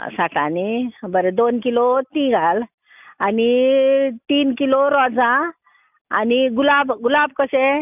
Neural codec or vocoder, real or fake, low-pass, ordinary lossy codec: none; real; 3.6 kHz; none